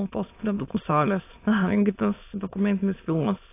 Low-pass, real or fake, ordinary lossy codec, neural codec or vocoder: 3.6 kHz; fake; AAC, 24 kbps; autoencoder, 22.05 kHz, a latent of 192 numbers a frame, VITS, trained on many speakers